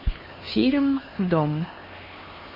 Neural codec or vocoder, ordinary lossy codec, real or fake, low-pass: codec, 16 kHz, 2 kbps, X-Codec, HuBERT features, trained on LibriSpeech; MP3, 24 kbps; fake; 5.4 kHz